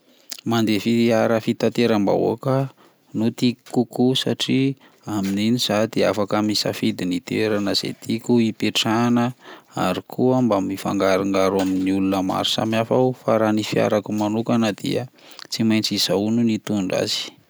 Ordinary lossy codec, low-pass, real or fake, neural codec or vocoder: none; none; real; none